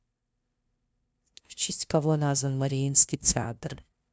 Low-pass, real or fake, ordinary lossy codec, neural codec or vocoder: none; fake; none; codec, 16 kHz, 0.5 kbps, FunCodec, trained on LibriTTS, 25 frames a second